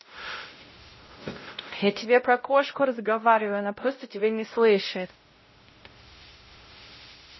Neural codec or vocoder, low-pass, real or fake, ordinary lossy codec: codec, 16 kHz, 0.5 kbps, X-Codec, WavLM features, trained on Multilingual LibriSpeech; 7.2 kHz; fake; MP3, 24 kbps